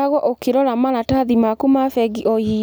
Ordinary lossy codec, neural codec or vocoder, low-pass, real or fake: none; none; none; real